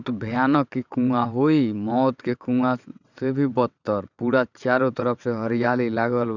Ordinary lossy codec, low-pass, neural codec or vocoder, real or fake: none; 7.2 kHz; vocoder, 22.05 kHz, 80 mel bands, WaveNeXt; fake